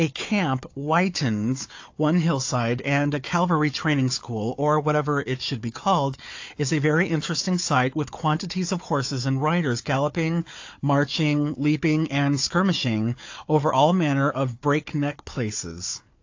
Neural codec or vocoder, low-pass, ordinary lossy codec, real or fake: codec, 16 kHz, 4 kbps, FunCodec, trained on Chinese and English, 50 frames a second; 7.2 kHz; AAC, 48 kbps; fake